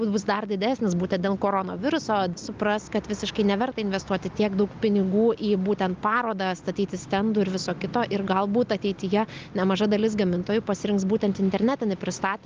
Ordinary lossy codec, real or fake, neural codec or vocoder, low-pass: Opus, 32 kbps; real; none; 7.2 kHz